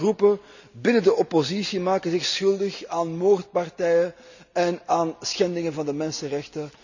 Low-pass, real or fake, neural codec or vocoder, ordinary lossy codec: 7.2 kHz; real; none; none